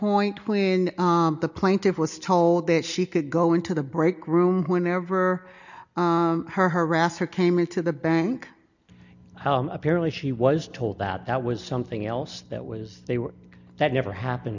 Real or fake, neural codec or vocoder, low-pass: real; none; 7.2 kHz